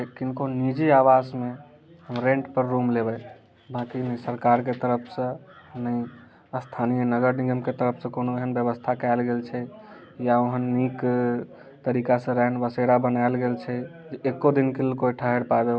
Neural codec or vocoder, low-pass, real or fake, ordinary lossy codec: none; none; real; none